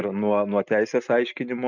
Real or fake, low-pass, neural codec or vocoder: real; 7.2 kHz; none